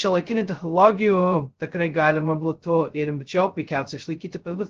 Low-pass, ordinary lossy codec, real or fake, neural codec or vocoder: 7.2 kHz; Opus, 16 kbps; fake; codec, 16 kHz, 0.2 kbps, FocalCodec